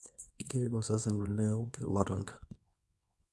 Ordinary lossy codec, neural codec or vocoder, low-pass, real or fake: none; codec, 24 kHz, 0.9 kbps, WavTokenizer, small release; none; fake